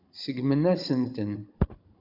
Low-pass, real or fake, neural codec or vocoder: 5.4 kHz; fake; codec, 16 kHz, 16 kbps, FunCodec, trained on Chinese and English, 50 frames a second